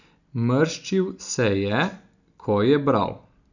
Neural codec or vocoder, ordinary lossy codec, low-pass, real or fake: none; none; 7.2 kHz; real